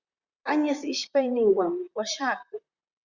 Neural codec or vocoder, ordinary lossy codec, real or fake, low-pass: vocoder, 44.1 kHz, 128 mel bands, Pupu-Vocoder; Opus, 64 kbps; fake; 7.2 kHz